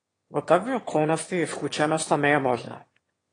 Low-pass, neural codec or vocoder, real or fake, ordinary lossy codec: 9.9 kHz; autoencoder, 22.05 kHz, a latent of 192 numbers a frame, VITS, trained on one speaker; fake; AAC, 32 kbps